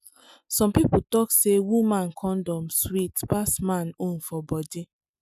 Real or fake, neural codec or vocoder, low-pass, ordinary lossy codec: real; none; 14.4 kHz; none